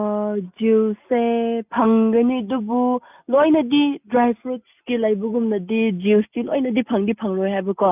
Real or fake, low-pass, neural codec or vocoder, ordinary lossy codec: real; 3.6 kHz; none; none